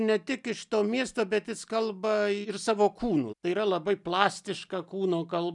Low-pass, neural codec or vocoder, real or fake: 10.8 kHz; none; real